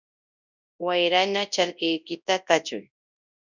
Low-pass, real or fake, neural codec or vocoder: 7.2 kHz; fake; codec, 24 kHz, 0.9 kbps, WavTokenizer, large speech release